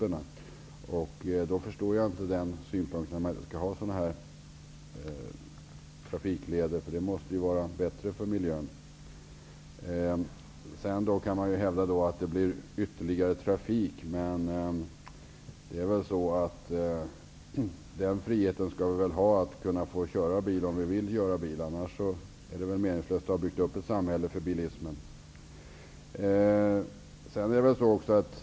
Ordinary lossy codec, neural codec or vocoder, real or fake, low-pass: none; none; real; none